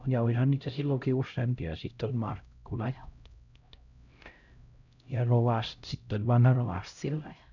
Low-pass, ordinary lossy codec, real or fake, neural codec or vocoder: 7.2 kHz; none; fake; codec, 16 kHz, 0.5 kbps, X-Codec, HuBERT features, trained on LibriSpeech